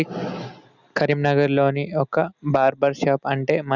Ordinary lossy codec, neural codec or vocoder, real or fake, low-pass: none; none; real; 7.2 kHz